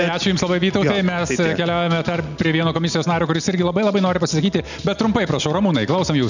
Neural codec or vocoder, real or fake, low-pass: none; real; 7.2 kHz